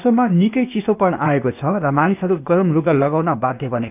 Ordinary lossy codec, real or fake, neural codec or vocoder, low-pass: none; fake; codec, 16 kHz, 0.8 kbps, ZipCodec; 3.6 kHz